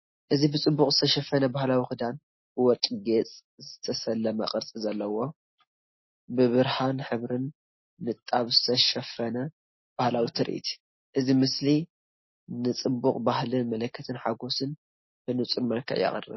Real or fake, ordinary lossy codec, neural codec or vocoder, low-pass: real; MP3, 24 kbps; none; 7.2 kHz